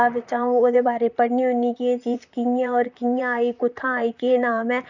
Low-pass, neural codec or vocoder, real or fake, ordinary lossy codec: 7.2 kHz; vocoder, 44.1 kHz, 128 mel bands, Pupu-Vocoder; fake; none